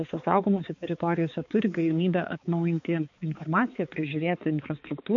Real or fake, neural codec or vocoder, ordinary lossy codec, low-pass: fake; codec, 16 kHz, 4 kbps, X-Codec, HuBERT features, trained on balanced general audio; MP3, 48 kbps; 7.2 kHz